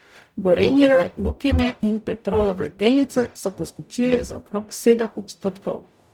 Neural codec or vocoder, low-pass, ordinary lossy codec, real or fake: codec, 44.1 kHz, 0.9 kbps, DAC; 19.8 kHz; none; fake